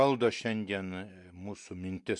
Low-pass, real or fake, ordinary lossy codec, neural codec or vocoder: 10.8 kHz; real; MP3, 64 kbps; none